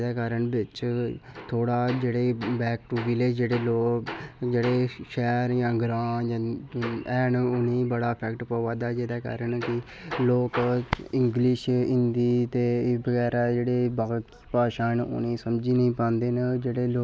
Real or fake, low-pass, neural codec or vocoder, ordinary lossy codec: real; none; none; none